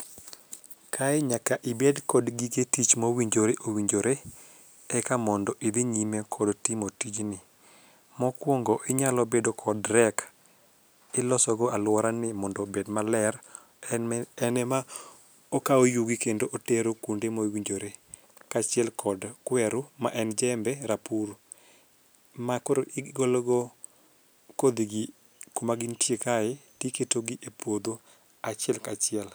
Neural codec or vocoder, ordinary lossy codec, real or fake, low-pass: none; none; real; none